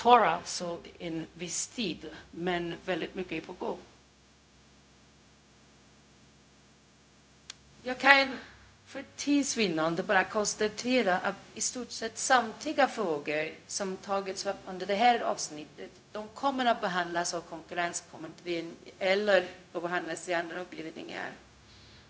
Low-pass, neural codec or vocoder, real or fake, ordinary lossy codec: none; codec, 16 kHz, 0.4 kbps, LongCat-Audio-Codec; fake; none